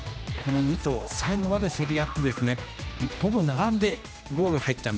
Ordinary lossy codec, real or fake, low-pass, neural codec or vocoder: none; fake; none; codec, 16 kHz, 1 kbps, X-Codec, HuBERT features, trained on general audio